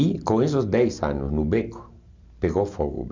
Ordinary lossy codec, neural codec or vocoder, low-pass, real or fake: MP3, 64 kbps; none; 7.2 kHz; real